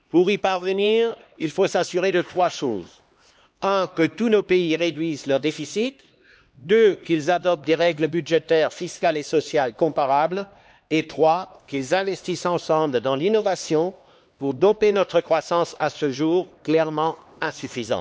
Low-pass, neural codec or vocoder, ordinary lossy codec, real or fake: none; codec, 16 kHz, 2 kbps, X-Codec, HuBERT features, trained on LibriSpeech; none; fake